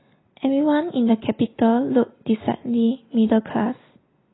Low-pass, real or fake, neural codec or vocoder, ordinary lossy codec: 7.2 kHz; real; none; AAC, 16 kbps